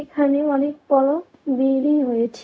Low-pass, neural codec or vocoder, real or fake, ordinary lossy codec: none; codec, 16 kHz, 0.4 kbps, LongCat-Audio-Codec; fake; none